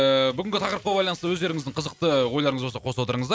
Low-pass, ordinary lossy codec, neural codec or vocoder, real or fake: none; none; none; real